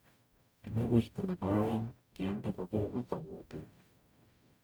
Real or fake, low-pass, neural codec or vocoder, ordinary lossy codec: fake; none; codec, 44.1 kHz, 0.9 kbps, DAC; none